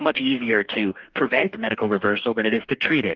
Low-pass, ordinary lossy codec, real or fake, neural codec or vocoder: 7.2 kHz; Opus, 32 kbps; fake; codec, 44.1 kHz, 2.6 kbps, DAC